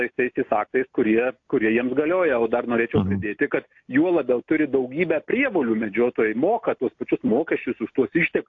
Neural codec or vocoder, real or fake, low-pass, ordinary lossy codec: none; real; 7.2 kHz; AAC, 48 kbps